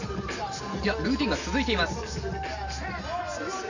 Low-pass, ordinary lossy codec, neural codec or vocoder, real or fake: 7.2 kHz; none; none; real